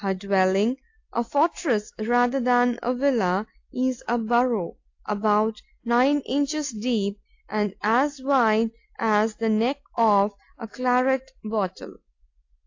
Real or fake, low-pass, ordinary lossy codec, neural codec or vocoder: real; 7.2 kHz; AAC, 48 kbps; none